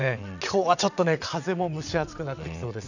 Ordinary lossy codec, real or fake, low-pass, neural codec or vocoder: none; fake; 7.2 kHz; vocoder, 22.05 kHz, 80 mel bands, WaveNeXt